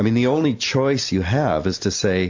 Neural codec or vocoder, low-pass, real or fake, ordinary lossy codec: none; 7.2 kHz; real; MP3, 48 kbps